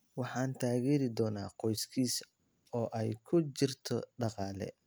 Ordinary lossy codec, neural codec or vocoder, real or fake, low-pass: none; none; real; none